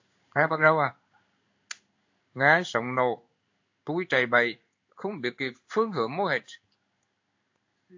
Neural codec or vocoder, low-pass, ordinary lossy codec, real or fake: codec, 16 kHz in and 24 kHz out, 1 kbps, XY-Tokenizer; 7.2 kHz; AAC, 48 kbps; fake